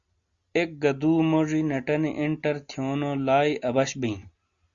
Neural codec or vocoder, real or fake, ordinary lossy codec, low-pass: none; real; Opus, 64 kbps; 7.2 kHz